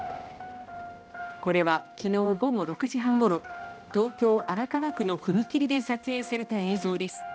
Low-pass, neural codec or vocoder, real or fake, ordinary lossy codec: none; codec, 16 kHz, 1 kbps, X-Codec, HuBERT features, trained on balanced general audio; fake; none